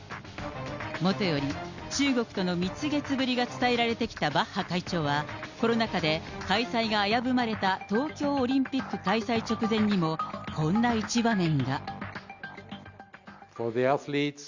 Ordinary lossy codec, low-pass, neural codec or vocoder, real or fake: Opus, 64 kbps; 7.2 kHz; none; real